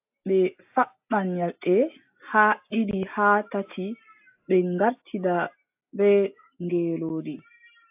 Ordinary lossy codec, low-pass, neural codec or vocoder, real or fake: AAC, 32 kbps; 3.6 kHz; none; real